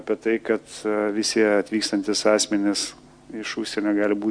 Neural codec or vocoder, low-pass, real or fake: none; 9.9 kHz; real